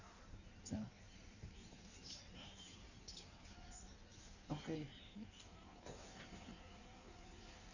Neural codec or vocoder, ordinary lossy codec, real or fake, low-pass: codec, 16 kHz in and 24 kHz out, 1.1 kbps, FireRedTTS-2 codec; none; fake; 7.2 kHz